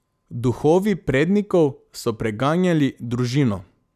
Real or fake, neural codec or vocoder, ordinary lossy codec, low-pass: real; none; none; 14.4 kHz